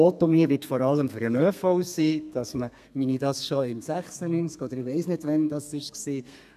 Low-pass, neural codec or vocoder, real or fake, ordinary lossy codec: 14.4 kHz; codec, 32 kHz, 1.9 kbps, SNAC; fake; none